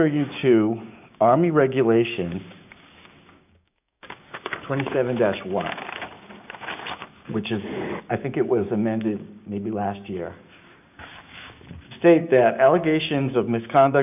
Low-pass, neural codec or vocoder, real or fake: 3.6 kHz; codec, 16 kHz, 6 kbps, DAC; fake